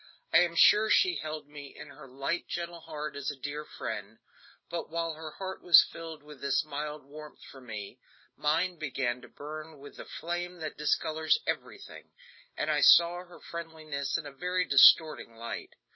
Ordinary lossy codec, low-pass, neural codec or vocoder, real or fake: MP3, 24 kbps; 7.2 kHz; none; real